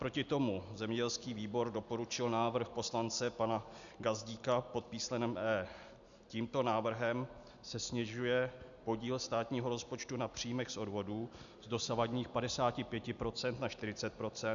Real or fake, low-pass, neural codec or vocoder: real; 7.2 kHz; none